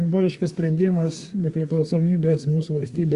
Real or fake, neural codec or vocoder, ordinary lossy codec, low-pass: fake; codec, 24 kHz, 1 kbps, SNAC; Opus, 64 kbps; 10.8 kHz